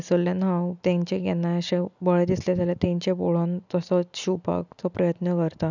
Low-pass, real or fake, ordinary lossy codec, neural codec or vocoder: 7.2 kHz; real; none; none